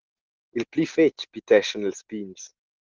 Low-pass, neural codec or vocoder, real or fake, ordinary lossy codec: 7.2 kHz; none; real; Opus, 16 kbps